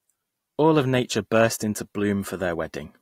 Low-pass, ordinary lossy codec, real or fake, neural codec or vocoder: 19.8 kHz; AAC, 48 kbps; real; none